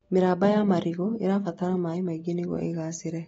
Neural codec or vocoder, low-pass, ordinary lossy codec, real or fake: none; 19.8 kHz; AAC, 24 kbps; real